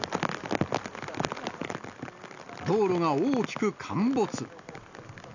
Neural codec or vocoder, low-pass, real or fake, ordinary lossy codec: none; 7.2 kHz; real; none